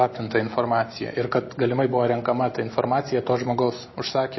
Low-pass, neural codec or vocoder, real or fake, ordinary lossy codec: 7.2 kHz; none; real; MP3, 24 kbps